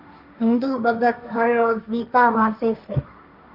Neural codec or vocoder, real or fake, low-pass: codec, 16 kHz, 1.1 kbps, Voila-Tokenizer; fake; 5.4 kHz